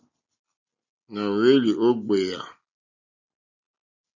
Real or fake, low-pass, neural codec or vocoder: real; 7.2 kHz; none